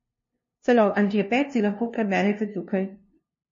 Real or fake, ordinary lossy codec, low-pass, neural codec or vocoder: fake; MP3, 32 kbps; 7.2 kHz; codec, 16 kHz, 0.5 kbps, FunCodec, trained on LibriTTS, 25 frames a second